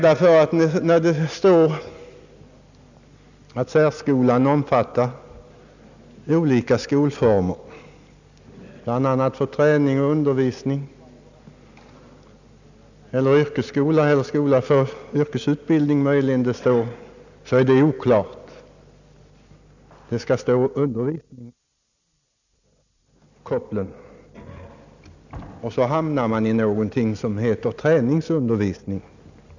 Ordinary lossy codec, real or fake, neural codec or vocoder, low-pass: none; real; none; 7.2 kHz